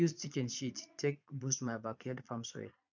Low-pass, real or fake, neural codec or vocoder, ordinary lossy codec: 7.2 kHz; fake; vocoder, 22.05 kHz, 80 mel bands, Vocos; none